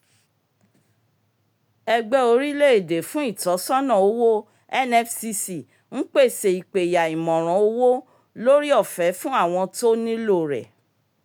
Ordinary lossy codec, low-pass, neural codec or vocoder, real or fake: none; none; none; real